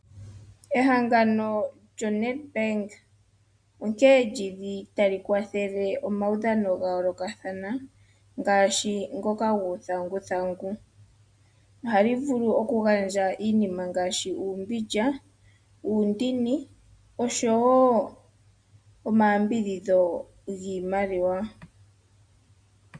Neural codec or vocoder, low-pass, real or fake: none; 9.9 kHz; real